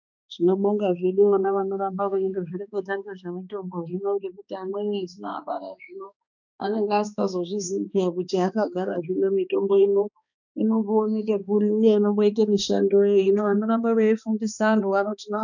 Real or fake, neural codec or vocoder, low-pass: fake; codec, 16 kHz, 2 kbps, X-Codec, HuBERT features, trained on balanced general audio; 7.2 kHz